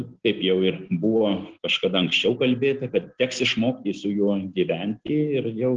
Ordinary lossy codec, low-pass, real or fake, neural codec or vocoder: Opus, 16 kbps; 7.2 kHz; real; none